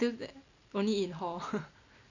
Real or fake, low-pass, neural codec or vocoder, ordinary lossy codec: real; 7.2 kHz; none; none